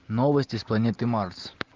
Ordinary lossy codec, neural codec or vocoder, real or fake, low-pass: Opus, 32 kbps; none; real; 7.2 kHz